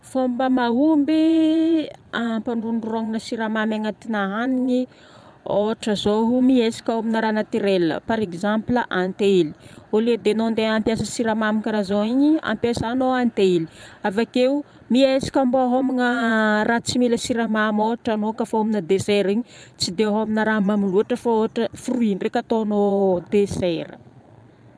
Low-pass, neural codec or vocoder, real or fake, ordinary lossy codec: none; vocoder, 22.05 kHz, 80 mel bands, Vocos; fake; none